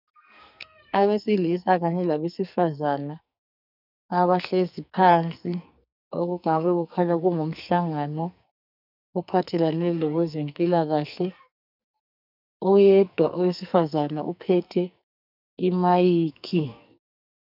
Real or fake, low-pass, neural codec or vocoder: fake; 5.4 kHz; codec, 44.1 kHz, 2.6 kbps, SNAC